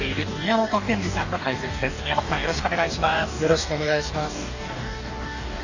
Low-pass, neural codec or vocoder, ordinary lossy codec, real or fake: 7.2 kHz; codec, 44.1 kHz, 2.6 kbps, DAC; none; fake